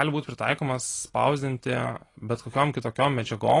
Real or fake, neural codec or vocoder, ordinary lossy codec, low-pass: real; none; AAC, 32 kbps; 10.8 kHz